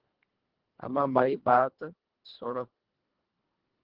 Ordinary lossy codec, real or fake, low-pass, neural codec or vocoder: Opus, 16 kbps; fake; 5.4 kHz; codec, 24 kHz, 1.5 kbps, HILCodec